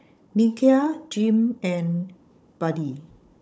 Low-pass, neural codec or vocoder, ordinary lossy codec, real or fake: none; codec, 16 kHz, 16 kbps, FunCodec, trained on Chinese and English, 50 frames a second; none; fake